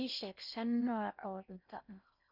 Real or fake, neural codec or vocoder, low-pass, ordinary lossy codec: fake; codec, 16 kHz in and 24 kHz out, 0.6 kbps, FocalCodec, streaming, 4096 codes; 5.4 kHz; none